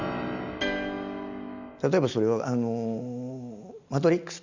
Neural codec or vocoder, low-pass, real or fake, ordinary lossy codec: none; 7.2 kHz; real; Opus, 64 kbps